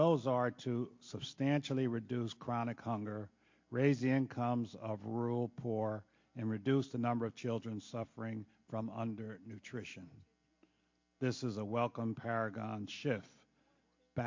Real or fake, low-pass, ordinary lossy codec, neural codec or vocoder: real; 7.2 kHz; MP3, 48 kbps; none